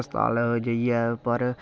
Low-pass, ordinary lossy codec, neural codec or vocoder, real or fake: none; none; none; real